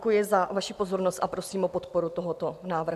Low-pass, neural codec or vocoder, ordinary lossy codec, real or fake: 14.4 kHz; none; AAC, 96 kbps; real